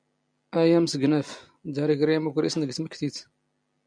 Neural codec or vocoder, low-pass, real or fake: none; 9.9 kHz; real